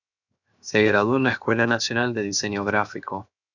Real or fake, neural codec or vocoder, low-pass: fake; codec, 16 kHz, 0.7 kbps, FocalCodec; 7.2 kHz